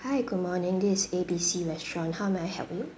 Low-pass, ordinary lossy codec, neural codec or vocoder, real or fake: none; none; none; real